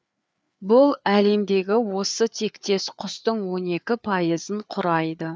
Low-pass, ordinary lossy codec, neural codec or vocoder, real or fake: none; none; codec, 16 kHz, 4 kbps, FreqCodec, larger model; fake